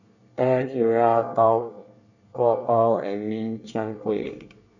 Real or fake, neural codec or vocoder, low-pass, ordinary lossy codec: fake; codec, 24 kHz, 1 kbps, SNAC; 7.2 kHz; none